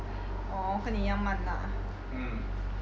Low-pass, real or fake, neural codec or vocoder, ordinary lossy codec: none; real; none; none